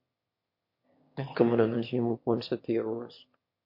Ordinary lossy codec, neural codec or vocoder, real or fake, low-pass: MP3, 32 kbps; autoencoder, 22.05 kHz, a latent of 192 numbers a frame, VITS, trained on one speaker; fake; 5.4 kHz